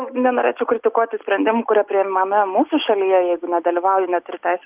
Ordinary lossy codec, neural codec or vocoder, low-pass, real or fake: Opus, 32 kbps; none; 3.6 kHz; real